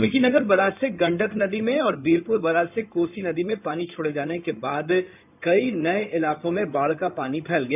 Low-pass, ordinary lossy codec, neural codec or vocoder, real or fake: 3.6 kHz; none; codec, 16 kHz in and 24 kHz out, 2.2 kbps, FireRedTTS-2 codec; fake